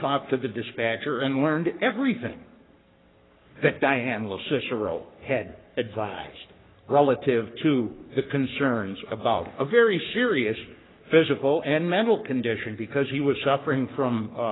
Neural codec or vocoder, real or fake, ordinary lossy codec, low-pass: codec, 44.1 kHz, 3.4 kbps, Pupu-Codec; fake; AAC, 16 kbps; 7.2 kHz